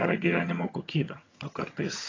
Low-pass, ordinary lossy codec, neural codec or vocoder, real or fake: 7.2 kHz; AAC, 32 kbps; vocoder, 22.05 kHz, 80 mel bands, HiFi-GAN; fake